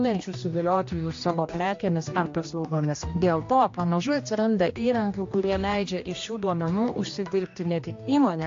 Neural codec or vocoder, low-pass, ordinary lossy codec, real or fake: codec, 16 kHz, 1 kbps, X-Codec, HuBERT features, trained on general audio; 7.2 kHz; AAC, 48 kbps; fake